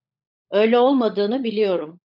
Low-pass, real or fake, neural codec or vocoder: 5.4 kHz; fake; codec, 16 kHz, 16 kbps, FunCodec, trained on LibriTTS, 50 frames a second